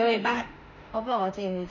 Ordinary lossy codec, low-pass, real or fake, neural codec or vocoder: none; 7.2 kHz; fake; autoencoder, 48 kHz, 32 numbers a frame, DAC-VAE, trained on Japanese speech